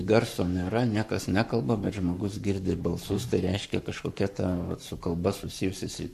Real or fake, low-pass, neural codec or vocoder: fake; 14.4 kHz; codec, 44.1 kHz, 7.8 kbps, Pupu-Codec